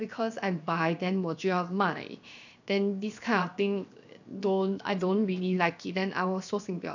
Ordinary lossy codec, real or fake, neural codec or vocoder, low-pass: none; fake; codec, 16 kHz, 0.7 kbps, FocalCodec; 7.2 kHz